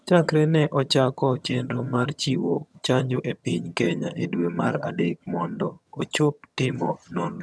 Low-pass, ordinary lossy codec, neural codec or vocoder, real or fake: none; none; vocoder, 22.05 kHz, 80 mel bands, HiFi-GAN; fake